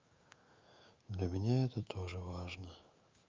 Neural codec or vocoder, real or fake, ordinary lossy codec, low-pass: autoencoder, 48 kHz, 128 numbers a frame, DAC-VAE, trained on Japanese speech; fake; Opus, 24 kbps; 7.2 kHz